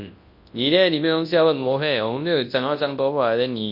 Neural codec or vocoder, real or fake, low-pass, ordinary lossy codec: codec, 24 kHz, 0.9 kbps, WavTokenizer, large speech release; fake; 5.4 kHz; MP3, 32 kbps